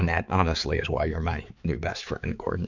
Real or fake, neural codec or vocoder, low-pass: fake; codec, 16 kHz, 4 kbps, X-Codec, HuBERT features, trained on balanced general audio; 7.2 kHz